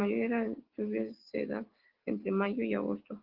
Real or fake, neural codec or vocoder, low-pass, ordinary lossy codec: real; none; 5.4 kHz; Opus, 16 kbps